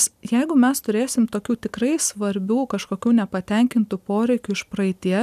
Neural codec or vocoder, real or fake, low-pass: none; real; 14.4 kHz